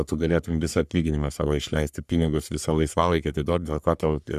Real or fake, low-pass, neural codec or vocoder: fake; 14.4 kHz; codec, 44.1 kHz, 3.4 kbps, Pupu-Codec